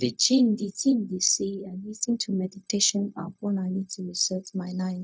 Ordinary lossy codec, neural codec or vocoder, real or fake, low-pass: none; codec, 16 kHz, 0.4 kbps, LongCat-Audio-Codec; fake; none